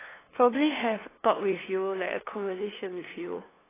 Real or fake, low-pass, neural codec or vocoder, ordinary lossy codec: fake; 3.6 kHz; codec, 16 kHz, 2 kbps, FunCodec, trained on LibriTTS, 25 frames a second; AAC, 16 kbps